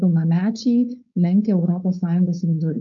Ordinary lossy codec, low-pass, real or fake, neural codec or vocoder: MP3, 48 kbps; 7.2 kHz; fake; codec, 16 kHz, 4.8 kbps, FACodec